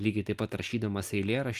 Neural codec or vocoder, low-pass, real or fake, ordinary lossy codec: none; 14.4 kHz; real; Opus, 32 kbps